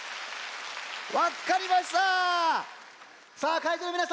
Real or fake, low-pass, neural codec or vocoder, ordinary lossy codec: real; none; none; none